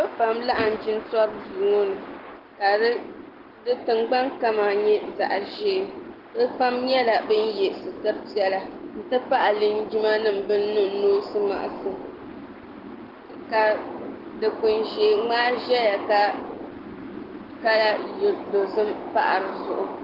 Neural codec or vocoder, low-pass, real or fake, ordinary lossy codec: none; 5.4 kHz; real; Opus, 16 kbps